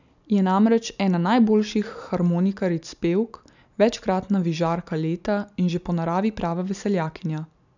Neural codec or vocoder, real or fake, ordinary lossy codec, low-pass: none; real; none; 7.2 kHz